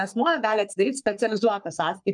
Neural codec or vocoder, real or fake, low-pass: codec, 44.1 kHz, 3.4 kbps, Pupu-Codec; fake; 10.8 kHz